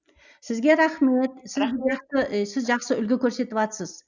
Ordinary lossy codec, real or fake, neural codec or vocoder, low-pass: none; real; none; 7.2 kHz